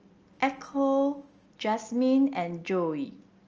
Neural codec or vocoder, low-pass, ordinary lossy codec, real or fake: none; 7.2 kHz; Opus, 24 kbps; real